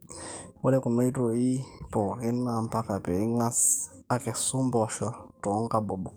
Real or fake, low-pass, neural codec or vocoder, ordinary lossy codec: fake; none; codec, 44.1 kHz, 7.8 kbps, DAC; none